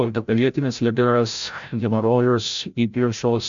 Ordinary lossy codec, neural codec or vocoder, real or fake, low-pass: MP3, 64 kbps; codec, 16 kHz, 0.5 kbps, FreqCodec, larger model; fake; 7.2 kHz